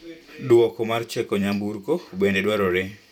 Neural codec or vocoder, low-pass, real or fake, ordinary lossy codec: vocoder, 44.1 kHz, 128 mel bands every 512 samples, BigVGAN v2; 19.8 kHz; fake; none